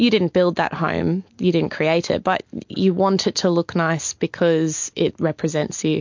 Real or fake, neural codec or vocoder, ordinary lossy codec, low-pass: real; none; MP3, 48 kbps; 7.2 kHz